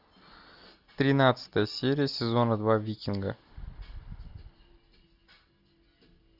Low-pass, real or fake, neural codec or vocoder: 5.4 kHz; real; none